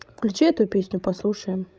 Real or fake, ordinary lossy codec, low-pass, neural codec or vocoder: fake; none; none; codec, 16 kHz, 16 kbps, FreqCodec, larger model